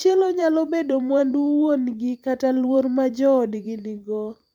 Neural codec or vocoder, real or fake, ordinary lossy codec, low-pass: vocoder, 44.1 kHz, 128 mel bands every 512 samples, BigVGAN v2; fake; MP3, 96 kbps; 19.8 kHz